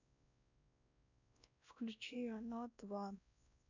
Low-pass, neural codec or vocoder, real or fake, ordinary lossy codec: 7.2 kHz; codec, 16 kHz, 1 kbps, X-Codec, WavLM features, trained on Multilingual LibriSpeech; fake; none